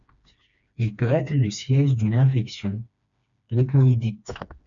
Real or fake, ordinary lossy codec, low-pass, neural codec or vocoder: fake; MP3, 96 kbps; 7.2 kHz; codec, 16 kHz, 2 kbps, FreqCodec, smaller model